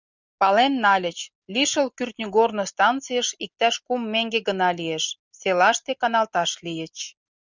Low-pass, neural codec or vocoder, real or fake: 7.2 kHz; none; real